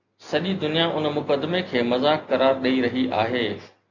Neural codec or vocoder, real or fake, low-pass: none; real; 7.2 kHz